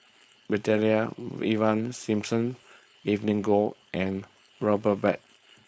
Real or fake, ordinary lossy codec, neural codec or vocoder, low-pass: fake; none; codec, 16 kHz, 4.8 kbps, FACodec; none